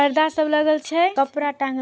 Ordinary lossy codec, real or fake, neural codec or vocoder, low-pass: none; real; none; none